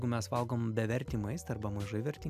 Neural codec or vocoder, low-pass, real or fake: none; 14.4 kHz; real